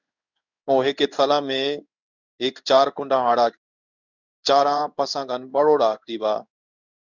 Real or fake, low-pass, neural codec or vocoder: fake; 7.2 kHz; codec, 16 kHz in and 24 kHz out, 1 kbps, XY-Tokenizer